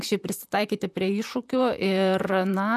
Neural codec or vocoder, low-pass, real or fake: vocoder, 44.1 kHz, 128 mel bands, Pupu-Vocoder; 14.4 kHz; fake